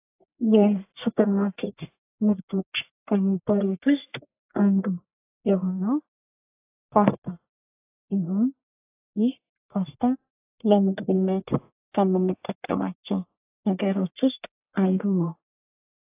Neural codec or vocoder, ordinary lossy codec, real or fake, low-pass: codec, 44.1 kHz, 1.7 kbps, Pupu-Codec; AAC, 24 kbps; fake; 3.6 kHz